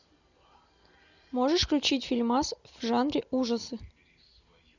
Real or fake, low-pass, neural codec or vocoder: real; 7.2 kHz; none